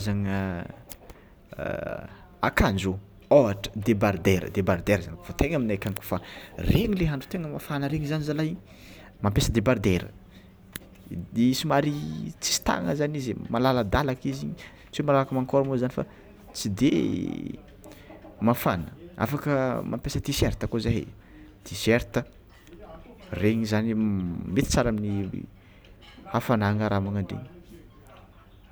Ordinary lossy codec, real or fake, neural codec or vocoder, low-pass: none; real; none; none